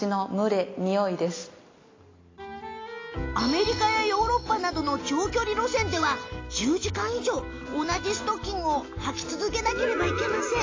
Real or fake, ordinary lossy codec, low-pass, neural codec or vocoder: real; AAC, 32 kbps; 7.2 kHz; none